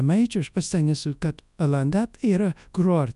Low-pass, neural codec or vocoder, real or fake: 10.8 kHz; codec, 24 kHz, 0.9 kbps, WavTokenizer, large speech release; fake